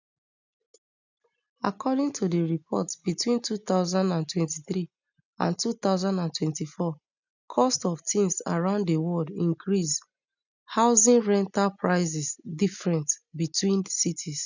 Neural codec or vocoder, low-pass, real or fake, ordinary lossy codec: none; 7.2 kHz; real; none